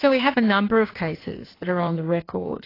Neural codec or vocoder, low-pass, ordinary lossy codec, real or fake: codec, 16 kHz in and 24 kHz out, 1.1 kbps, FireRedTTS-2 codec; 5.4 kHz; AAC, 24 kbps; fake